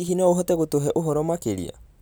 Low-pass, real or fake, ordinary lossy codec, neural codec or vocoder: none; real; none; none